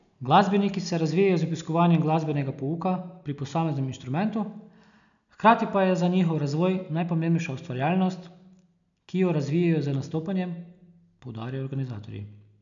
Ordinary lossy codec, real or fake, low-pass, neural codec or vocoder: none; real; 7.2 kHz; none